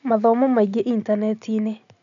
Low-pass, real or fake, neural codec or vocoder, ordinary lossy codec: 7.2 kHz; real; none; none